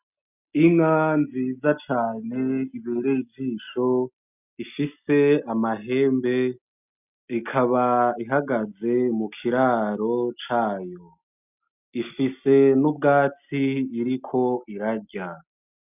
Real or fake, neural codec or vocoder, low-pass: real; none; 3.6 kHz